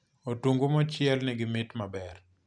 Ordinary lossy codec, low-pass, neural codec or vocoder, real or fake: none; 9.9 kHz; none; real